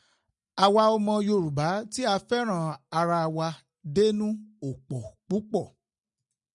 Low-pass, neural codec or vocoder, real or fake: 10.8 kHz; none; real